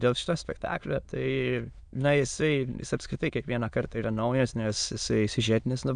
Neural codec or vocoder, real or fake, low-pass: autoencoder, 22.05 kHz, a latent of 192 numbers a frame, VITS, trained on many speakers; fake; 9.9 kHz